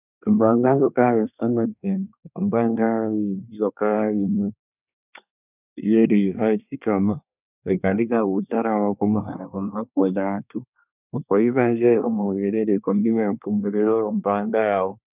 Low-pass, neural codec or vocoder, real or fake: 3.6 kHz; codec, 24 kHz, 1 kbps, SNAC; fake